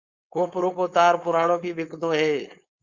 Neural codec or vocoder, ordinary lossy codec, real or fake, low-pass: codec, 16 kHz, 4.8 kbps, FACodec; Opus, 64 kbps; fake; 7.2 kHz